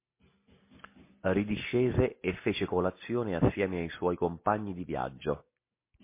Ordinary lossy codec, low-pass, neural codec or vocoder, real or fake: MP3, 24 kbps; 3.6 kHz; none; real